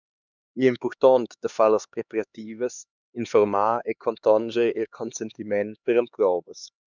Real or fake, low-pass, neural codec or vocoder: fake; 7.2 kHz; codec, 16 kHz, 2 kbps, X-Codec, HuBERT features, trained on LibriSpeech